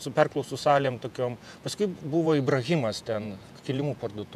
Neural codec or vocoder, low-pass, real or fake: vocoder, 48 kHz, 128 mel bands, Vocos; 14.4 kHz; fake